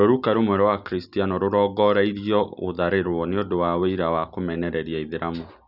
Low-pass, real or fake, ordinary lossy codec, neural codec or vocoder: 5.4 kHz; real; none; none